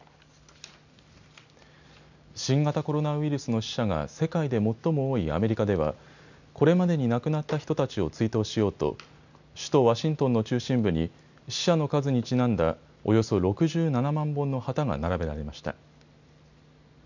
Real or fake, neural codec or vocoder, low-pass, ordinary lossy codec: real; none; 7.2 kHz; none